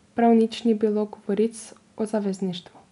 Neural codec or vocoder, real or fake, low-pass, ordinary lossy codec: none; real; 10.8 kHz; none